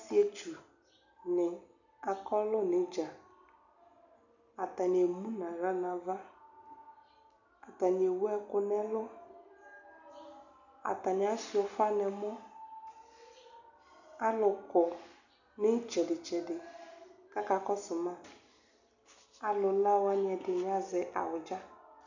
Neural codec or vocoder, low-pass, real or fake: none; 7.2 kHz; real